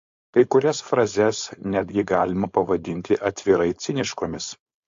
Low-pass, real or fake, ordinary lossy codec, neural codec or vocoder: 7.2 kHz; fake; AAC, 48 kbps; codec, 16 kHz, 4.8 kbps, FACodec